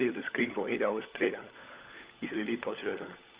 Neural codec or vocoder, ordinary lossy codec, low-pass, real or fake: codec, 16 kHz, 16 kbps, FunCodec, trained on LibriTTS, 50 frames a second; Opus, 64 kbps; 3.6 kHz; fake